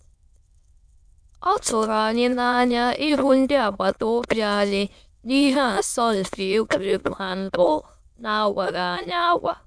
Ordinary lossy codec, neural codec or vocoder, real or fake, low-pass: none; autoencoder, 22.05 kHz, a latent of 192 numbers a frame, VITS, trained on many speakers; fake; none